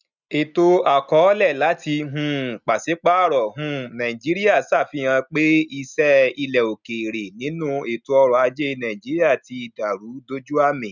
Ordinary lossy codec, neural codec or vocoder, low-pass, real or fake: none; none; 7.2 kHz; real